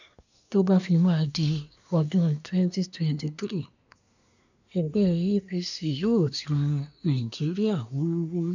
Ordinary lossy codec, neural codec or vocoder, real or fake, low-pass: none; codec, 24 kHz, 1 kbps, SNAC; fake; 7.2 kHz